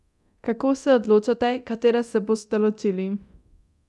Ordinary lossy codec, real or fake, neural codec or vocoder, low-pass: none; fake; codec, 24 kHz, 0.9 kbps, DualCodec; none